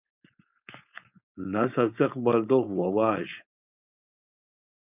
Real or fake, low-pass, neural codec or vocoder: fake; 3.6 kHz; codec, 16 kHz, 4.8 kbps, FACodec